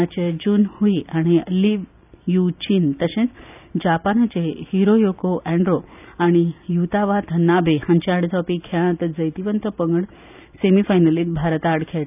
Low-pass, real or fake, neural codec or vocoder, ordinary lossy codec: 3.6 kHz; real; none; none